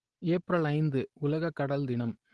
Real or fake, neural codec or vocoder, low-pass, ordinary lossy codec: real; none; 10.8 kHz; Opus, 16 kbps